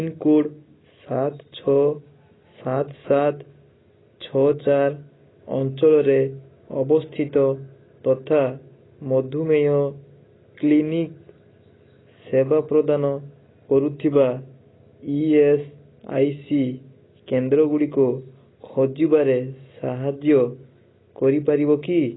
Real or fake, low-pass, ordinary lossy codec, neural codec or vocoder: real; 7.2 kHz; AAC, 16 kbps; none